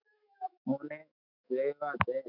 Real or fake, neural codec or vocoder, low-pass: fake; autoencoder, 48 kHz, 128 numbers a frame, DAC-VAE, trained on Japanese speech; 5.4 kHz